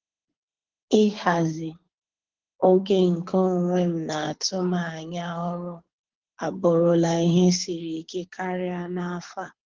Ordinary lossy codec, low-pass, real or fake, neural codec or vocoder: Opus, 16 kbps; 7.2 kHz; fake; codec, 24 kHz, 6 kbps, HILCodec